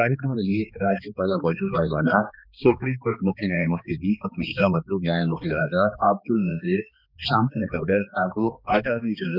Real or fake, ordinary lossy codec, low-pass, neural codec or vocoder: fake; none; 5.4 kHz; codec, 16 kHz, 2 kbps, X-Codec, HuBERT features, trained on balanced general audio